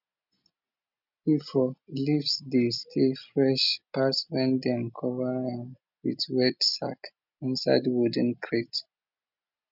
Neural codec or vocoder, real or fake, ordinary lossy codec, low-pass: none; real; none; 5.4 kHz